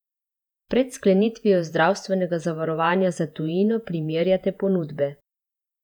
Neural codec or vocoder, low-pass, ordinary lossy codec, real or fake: vocoder, 48 kHz, 128 mel bands, Vocos; 19.8 kHz; none; fake